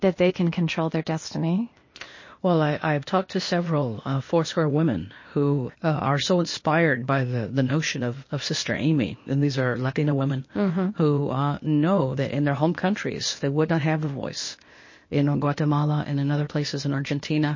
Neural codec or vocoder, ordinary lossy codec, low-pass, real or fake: codec, 16 kHz, 0.8 kbps, ZipCodec; MP3, 32 kbps; 7.2 kHz; fake